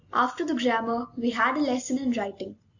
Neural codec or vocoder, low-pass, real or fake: none; 7.2 kHz; real